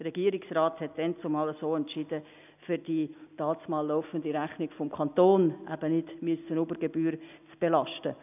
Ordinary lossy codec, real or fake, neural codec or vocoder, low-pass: none; real; none; 3.6 kHz